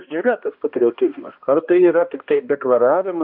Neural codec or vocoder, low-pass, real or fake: codec, 16 kHz, 1 kbps, X-Codec, HuBERT features, trained on balanced general audio; 5.4 kHz; fake